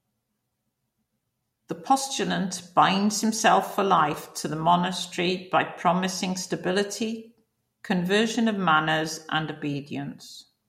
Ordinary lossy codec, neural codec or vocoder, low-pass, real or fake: MP3, 64 kbps; none; 19.8 kHz; real